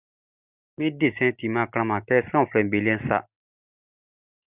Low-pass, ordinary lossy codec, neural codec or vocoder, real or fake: 3.6 kHz; Opus, 64 kbps; none; real